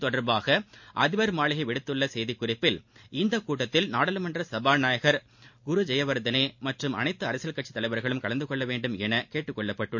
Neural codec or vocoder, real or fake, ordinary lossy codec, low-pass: none; real; MP3, 48 kbps; 7.2 kHz